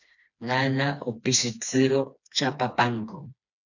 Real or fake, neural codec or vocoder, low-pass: fake; codec, 16 kHz, 2 kbps, FreqCodec, smaller model; 7.2 kHz